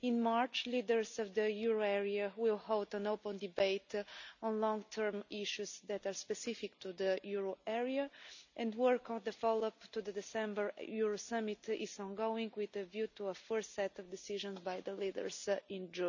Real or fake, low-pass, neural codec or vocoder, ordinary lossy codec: real; 7.2 kHz; none; none